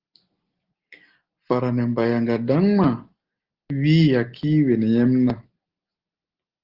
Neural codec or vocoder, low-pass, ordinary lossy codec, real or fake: none; 5.4 kHz; Opus, 16 kbps; real